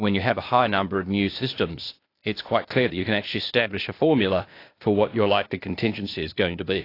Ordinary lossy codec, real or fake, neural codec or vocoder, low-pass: AAC, 32 kbps; fake; codec, 16 kHz, 0.8 kbps, ZipCodec; 5.4 kHz